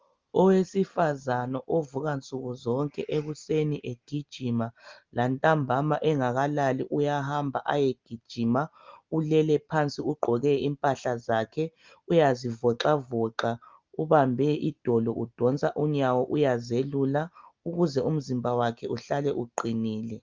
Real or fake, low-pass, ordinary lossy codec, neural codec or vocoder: real; 7.2 kHz; Opus, 32 kbps; none